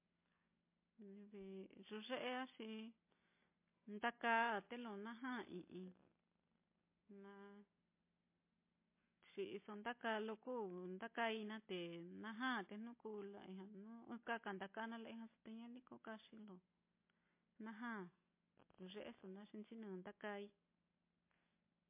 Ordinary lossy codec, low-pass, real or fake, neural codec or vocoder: MP3, 24 kbps; 3.6 kHz; real; none